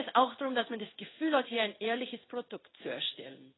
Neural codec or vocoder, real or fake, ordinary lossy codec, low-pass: codec, 16 kHz in and 24 kHz out, 1 kbps, XY-Tokenizer; fake; AAC, 16 kbps; 7.2 kHz